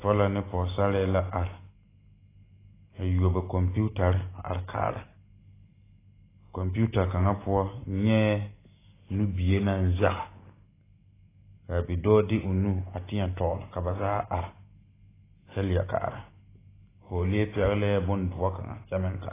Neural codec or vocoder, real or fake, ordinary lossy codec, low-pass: none; real; AAC, 16 kbps; 3.6 kHz